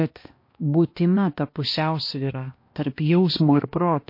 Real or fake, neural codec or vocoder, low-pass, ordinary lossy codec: fake; codec, 16 kHz, 1 kbps, X-Codec, HuBERT features, trained on balanced general audio; 5.4 kHz; MP3, 32 kbps